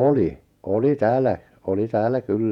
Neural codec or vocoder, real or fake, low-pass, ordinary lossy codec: vocoder, 48 kHz, 128 mel bands, Vocos; fake; 19.8 kHz; none